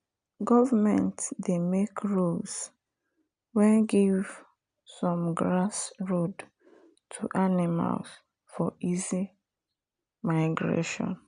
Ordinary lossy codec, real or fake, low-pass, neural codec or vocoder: MP3, 96 kbps; real; 9.9 kHz; none